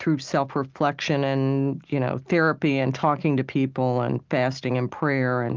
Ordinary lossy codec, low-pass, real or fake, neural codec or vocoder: Opus, 24 kbps; 7.2 kHz; real; none